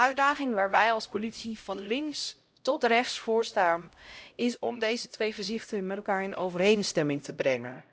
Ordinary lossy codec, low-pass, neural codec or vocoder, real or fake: none; none; codec, 16 kHz, 0.5 kbps, X-Codec, HuBERT features, trained on LibriSpeech; fake